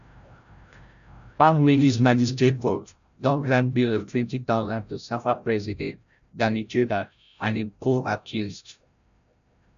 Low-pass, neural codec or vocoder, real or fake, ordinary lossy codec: 7.2 kHz; codec, 16 kHz, 0.5 kbps, FreqCodec, larger model; fake; none